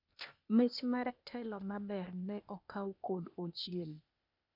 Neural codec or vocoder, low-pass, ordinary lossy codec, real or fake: codec, 16 kHz, 0.8 kbps, ZipCodec; 5.4 kHz; none; fake